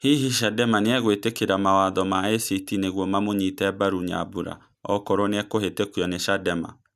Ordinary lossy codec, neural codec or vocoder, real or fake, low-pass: none; none; real; 14.4 kHz